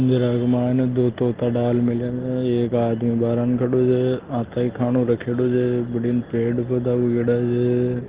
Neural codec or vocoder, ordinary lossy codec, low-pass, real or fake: none; Opus, 16 kbps; 3.6 kHz; real